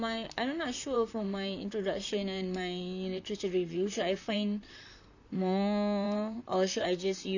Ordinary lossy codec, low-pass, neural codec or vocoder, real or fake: none; 7.2 kHz; vocoder, 44.1 kHz, 128 mel bands, Pupu-Vocoder; fake